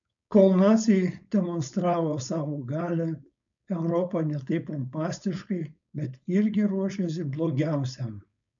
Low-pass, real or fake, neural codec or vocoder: 7.2 kHz; fake; codec, 16 kHz, 4.8 kbps, FACodec